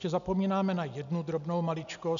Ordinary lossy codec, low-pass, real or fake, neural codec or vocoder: MP3, 96 kbps; 7.2 kHz; real; none